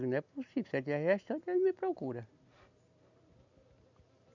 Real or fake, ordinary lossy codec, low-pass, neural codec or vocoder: real; none; 7.2 kHz; none